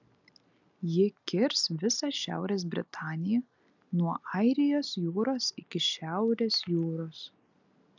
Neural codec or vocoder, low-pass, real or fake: none; 7.2 kHz; real